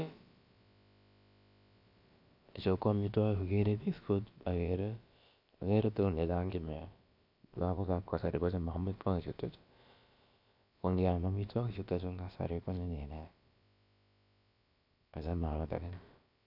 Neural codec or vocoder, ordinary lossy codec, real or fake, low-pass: codec, 16 kHz, about 1 kbps, DyCAST, with the encoder's durations; none; fake; 5.4 kHz